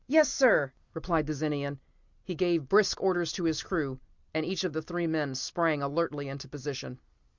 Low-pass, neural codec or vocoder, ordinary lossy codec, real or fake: 7.2 kHz; none; Opus, 64 kbps; real